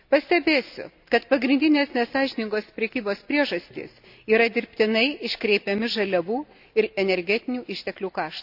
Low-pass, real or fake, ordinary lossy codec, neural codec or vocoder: 5.4 kHz; real; none; none